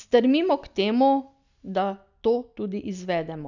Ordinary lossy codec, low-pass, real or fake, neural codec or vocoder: none; 7.2 kHz; real; none